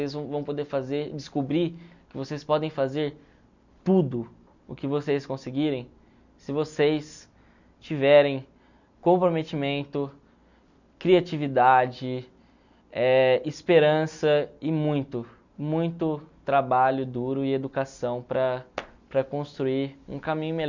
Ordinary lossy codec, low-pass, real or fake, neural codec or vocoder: none; 7.2 kHz; real; none